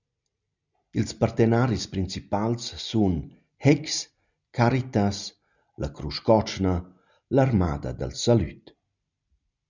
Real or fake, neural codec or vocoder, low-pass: real; none; 7.2 kHz